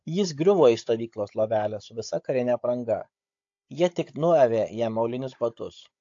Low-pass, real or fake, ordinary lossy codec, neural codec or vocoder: 7.2 kHz; fake; AAC, 48 kbps; codec, 16 kHz, 16 kbps, FunCodec, trained on Chinese and English, 50 frames a second